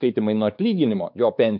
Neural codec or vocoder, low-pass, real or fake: codec, 16 kHz, 4 kbps, X-Codec, WavLM features, trained on Multilingual LibriSpeech; 5.4 kHz; fake